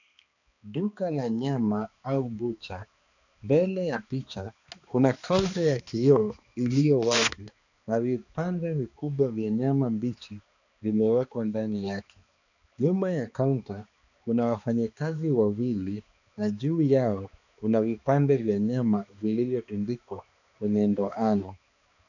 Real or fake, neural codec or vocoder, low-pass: fake; codec, 16 kHz, 2 kbps, X-Codec, HuBERT features, trained on balanced general audio; 7.2 kHz